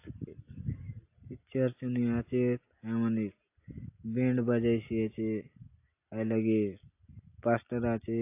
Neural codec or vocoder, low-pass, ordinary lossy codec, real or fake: none; 3.6 kHz; AAC, 24 kbps; real